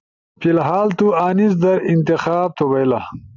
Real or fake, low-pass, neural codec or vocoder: real; 7.2 kHz; none